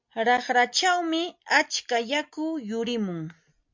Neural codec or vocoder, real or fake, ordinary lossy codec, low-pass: none; real; MP3, 64 kbps; 7.2 kHz